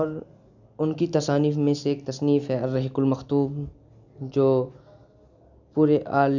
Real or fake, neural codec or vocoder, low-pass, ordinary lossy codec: real; none; 7.2 kHz; none